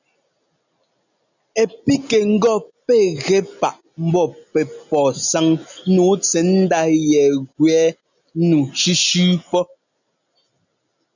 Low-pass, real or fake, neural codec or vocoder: 7.2 kHz; real; none